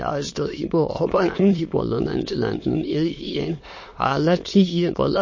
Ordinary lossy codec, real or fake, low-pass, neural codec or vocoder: MP3, 32 kbps; fake; 7.2 kHz; autoencoder, 22.05 kHz, a latent of 192 numbers a frame, VITS, trained on many speakers